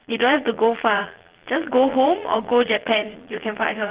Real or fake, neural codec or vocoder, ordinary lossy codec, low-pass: fake; vocoder, 22.05 kHz, 80 mel bands, Vocos; Opus, 16 kbps; 3.6 kHz